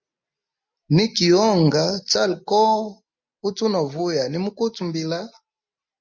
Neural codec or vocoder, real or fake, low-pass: none; real; 7.2 kHz